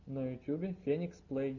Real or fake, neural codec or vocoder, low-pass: real; none; 7.2 kHz